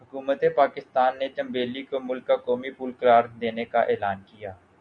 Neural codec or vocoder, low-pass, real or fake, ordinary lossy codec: none; 9.9 kHz; real; Opus, 64 kbps